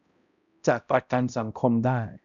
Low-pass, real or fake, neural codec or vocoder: 7.2 kHz; fake; codec, 16 kHz, 0.5 kbps, X-Codec, HuBERT features, trained on balanced general audio